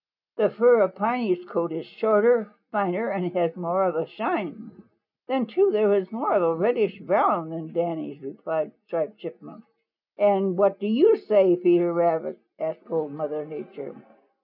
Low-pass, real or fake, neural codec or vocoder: 5.4 kHz; real; none